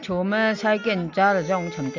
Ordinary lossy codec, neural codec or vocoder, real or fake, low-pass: MP3, 64 kbps; none; real; 7.2 kHz